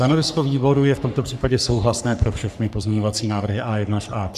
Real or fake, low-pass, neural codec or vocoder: fake; 14.4 kHz; codec, 44.1 kHz, 3.4 kbps, Pupu-Codec